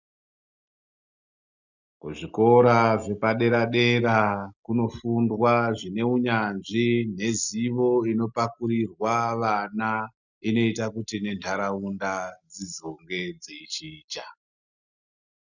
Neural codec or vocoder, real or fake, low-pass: none; real; 7.2 kHz